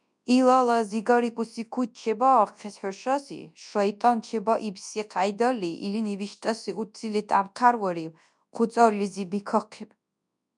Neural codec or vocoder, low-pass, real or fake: codec, 24 kHz, 0.9 kbps, WavTokenizer, large speech release; 10.8 kHz; fake